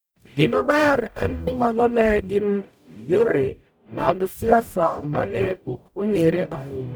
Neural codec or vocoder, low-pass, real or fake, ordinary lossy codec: codec, 44.1 kHz, 0.9 kbps, DAC; none; fake; none